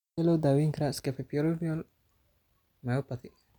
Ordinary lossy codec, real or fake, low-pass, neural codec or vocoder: none; real; 19.8 kHz; none